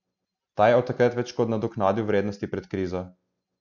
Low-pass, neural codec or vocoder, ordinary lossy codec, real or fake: 7.2 kHz; none; none; real